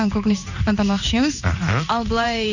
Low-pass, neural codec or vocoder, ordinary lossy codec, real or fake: 7.2 kHz; codec, 16 kHz in and 24 kHz out, 1 kbps, XY-Tokenizer; none; fake